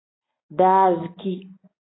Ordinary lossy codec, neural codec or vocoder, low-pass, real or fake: AAC, 16 kbps; none; 7.2 kHz; real